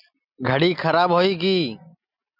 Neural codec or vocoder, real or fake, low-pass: none; real; 5.4 kHz